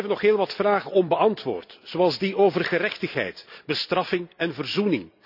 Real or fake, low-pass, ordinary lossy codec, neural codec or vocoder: real; 5.4 kHz; none; none